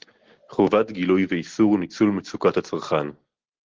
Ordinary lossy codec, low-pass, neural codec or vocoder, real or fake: Opus, 16 kbps; 7.2 kHz; none; real